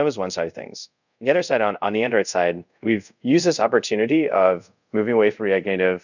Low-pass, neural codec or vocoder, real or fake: 7.2 kHz; codec, 24 kHz, 0.5 kbps, DualCodec; fake